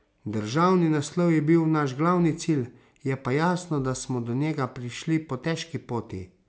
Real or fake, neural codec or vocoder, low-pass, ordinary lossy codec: real; none; none; none